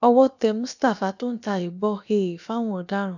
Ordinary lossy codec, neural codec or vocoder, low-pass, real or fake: none; codec, 16 kHz, about 1 kbps, DyCAST, with the encoder's durations; 7.2 kHz; fake